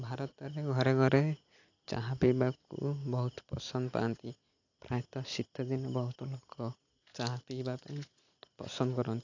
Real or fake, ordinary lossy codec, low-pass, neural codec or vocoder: real; AAC, 48 kbps; 7.2 kHz; none